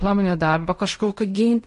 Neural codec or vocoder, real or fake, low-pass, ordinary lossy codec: codec, 16 kHz in and 24 kHz out, 0.4 kbps, LongCat-Audio-Codec, fine tuned four codebook decoder; fake; 10.8 kHz; MP3, 48 kbps